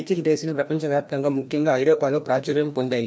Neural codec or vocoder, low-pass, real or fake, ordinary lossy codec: codec, 16 kHz, 1 kbps, FreqCodec, larger model; none; fake; none